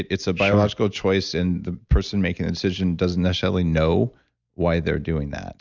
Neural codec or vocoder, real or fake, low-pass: none; real; 7.2 kHz